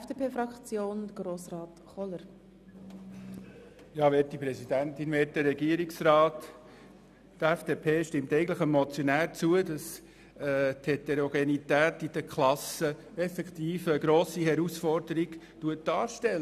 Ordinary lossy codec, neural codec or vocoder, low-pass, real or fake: none; none; 14.4 kHz; real